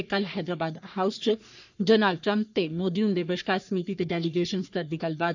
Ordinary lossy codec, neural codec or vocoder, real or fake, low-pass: none; codec, 44.1 kHz, 3.4 kbps, Pupu-Codec; fake; 7.2 kHz